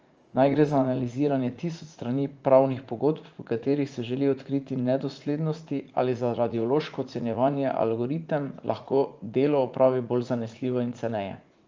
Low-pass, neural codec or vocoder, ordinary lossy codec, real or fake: 7.2 kHz; vocoder, 44.1 kHz, 80 mel bands, Vocos; Opus, 24 kbps; fake